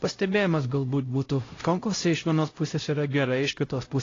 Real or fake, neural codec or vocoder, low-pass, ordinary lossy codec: fake; codec, 16 kHz, 0.5 kbps, X-Codec, HuBERT features, trained on LibriSpeech; 7.2 kHz; AAC, 32 kbps